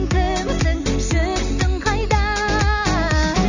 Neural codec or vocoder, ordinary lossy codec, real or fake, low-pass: none; none; real; 7.2 kHz